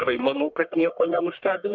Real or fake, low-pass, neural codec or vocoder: fake; 7.2 kHz; codec, 44.1 kHz, 1.7 kbps, Pupu-Codec